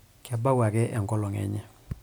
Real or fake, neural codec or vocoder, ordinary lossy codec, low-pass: real; none; none; none